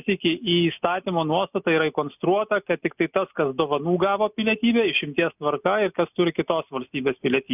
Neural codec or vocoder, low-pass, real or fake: none; 3.6 kHz; real